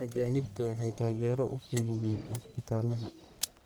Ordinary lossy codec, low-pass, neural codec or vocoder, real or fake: none; none; codec, 44.1 kHz, 1.7 kbps, Pupu-Codec; fake